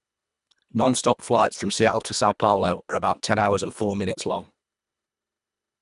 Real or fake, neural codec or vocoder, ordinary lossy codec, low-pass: fake; codec, 24 kHz, 1.5 kbps, HILCodec; none; 10.8 kHz